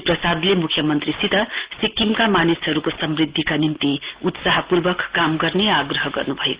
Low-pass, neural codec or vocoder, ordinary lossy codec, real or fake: 3.6 kHz; none; Opus, 16 kbps; real